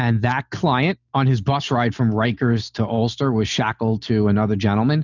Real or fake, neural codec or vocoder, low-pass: real; none; 7.2 kHz